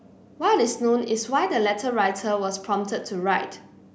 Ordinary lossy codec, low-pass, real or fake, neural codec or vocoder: none; none; real; none